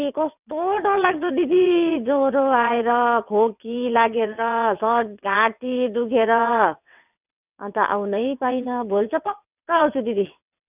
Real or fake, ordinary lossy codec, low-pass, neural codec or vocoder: fake; none; 3.6 kHz; vocoder, 22.05 kHz, 80 mel bands, WaveNeXt